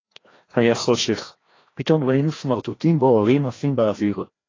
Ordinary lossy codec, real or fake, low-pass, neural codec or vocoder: AAC, 32 kbps; fake; 7.2 kHz; codec, 16 kHz, 1 kbps, FreqCodec, larger model